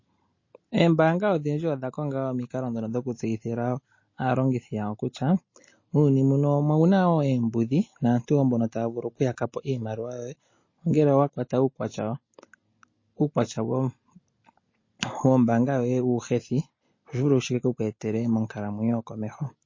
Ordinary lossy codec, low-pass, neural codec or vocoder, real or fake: MP3, 32 kbps; 7.2 kHz; none; real